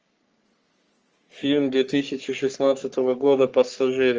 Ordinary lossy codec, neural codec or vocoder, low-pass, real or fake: Opus, 24 kbps; codec, 44.1 kHz, 3.4 kbps, Pupu-Codec; 7.2 kHz; fake